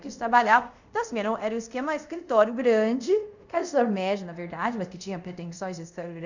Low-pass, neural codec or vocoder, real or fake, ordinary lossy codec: 7.2 kHz; codec, 24 kHz, 0.5 kbps, DualCodec; fake; none